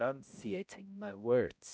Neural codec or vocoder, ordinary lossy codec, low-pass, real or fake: codec, 16 kHz, 0.5 kbps, X-Codec, HuBERT features, trained on balanced general audio; none; none; fake